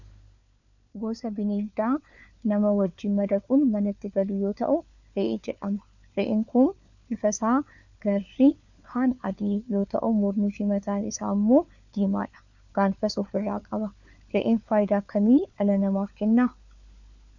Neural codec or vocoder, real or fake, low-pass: codec, 16 kHz, 4 kbps, FunCodec, trained on LibriTTS, 50 frames a second; fake; 7.2 kHz